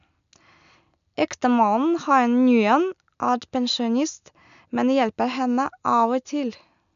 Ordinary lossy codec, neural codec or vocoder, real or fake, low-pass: none; none; real; 7.2 kHz